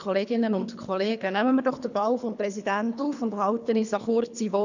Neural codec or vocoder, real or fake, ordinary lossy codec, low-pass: codec, 24 kHz, 3 kbps, HILCodec; fake; none; 7.2 kHz